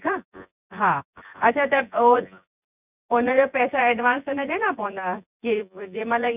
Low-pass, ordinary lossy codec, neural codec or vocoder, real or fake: 3.6 kHz; none; vocoder, 24 kHz, 100 mel bands, Vocos; fake